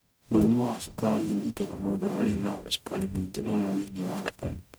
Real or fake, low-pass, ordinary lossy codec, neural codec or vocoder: fake; none; none; codec, 44.1 kHz, 0.9 kbps, DAC